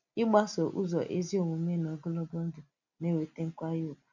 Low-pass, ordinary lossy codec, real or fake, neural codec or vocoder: 7.2 kHz; none; real; none